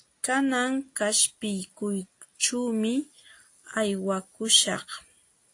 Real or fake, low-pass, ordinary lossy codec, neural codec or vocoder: real; 10.8 kHz; AAC, 48 kbps; none